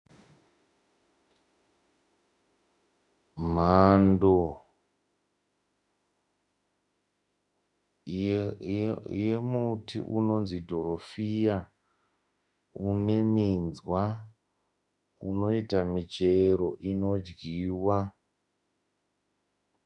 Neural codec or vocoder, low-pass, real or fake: autoencoder, 48 kHz, 32 numbers a frame, DAC-VAE, trained on Japanese speech; 10.8 kHz; fake